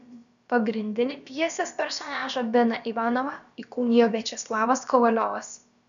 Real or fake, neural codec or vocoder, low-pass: fake; codec, 16 kHz, about 1 kbps, DyCAST, with the encoder's durations; 7.2 kHz